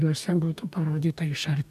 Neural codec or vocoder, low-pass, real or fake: codec, 44.1 kHz, 2.6 kbps, DAC; 14.4 kHz; fake